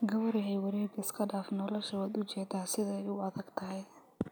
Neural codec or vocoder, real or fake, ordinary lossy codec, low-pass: none; real; none; none